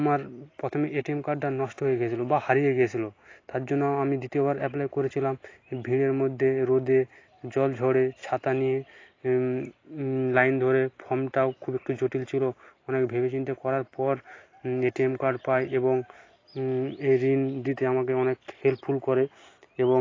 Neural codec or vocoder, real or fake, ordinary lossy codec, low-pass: none; real; AAC, 32 kbps; 7.2 kHz